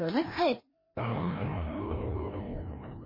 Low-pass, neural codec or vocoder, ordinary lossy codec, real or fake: 5.4 kHz; codec, 16 kHz, 1 kbps, FreqCodec, larger model; MP3, 24 kbps; fake